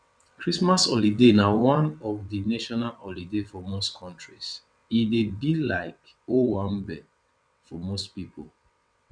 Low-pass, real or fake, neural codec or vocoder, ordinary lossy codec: 9.9 kHz; fake; vocoder, 22.05 kHz, 80 mel bands, WaveNeXt; none